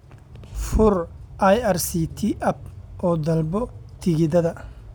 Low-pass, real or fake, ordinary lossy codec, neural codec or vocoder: none; real; none; none